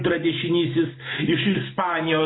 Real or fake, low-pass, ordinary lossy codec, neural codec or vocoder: real; 7.2 kHz; AAC, 16 kbps; none